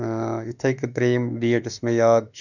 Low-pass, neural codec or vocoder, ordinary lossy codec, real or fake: 7.2 kHz; autoencoder, 48 kHz, 32 numbers a frame, DAC-VAE, trained on Japanese speech; none; fake